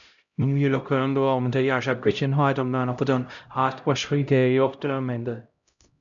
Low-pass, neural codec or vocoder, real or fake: 7.2 kHz; codec, 16 kHz, 0.5 kbps, X-Codec, HuBERT features, trained on LibriSpeech; fake